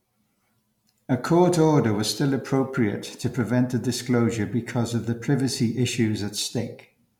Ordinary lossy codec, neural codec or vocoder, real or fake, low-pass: MP3, 96 kbps; none; real; 19.8 kHz